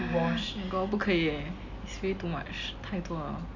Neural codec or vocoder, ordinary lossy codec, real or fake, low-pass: none; none; real; 7.2 kHz